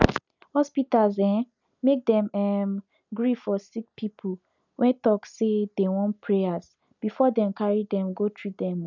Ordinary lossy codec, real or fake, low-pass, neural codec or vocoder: none; real; 7.2 kHz; none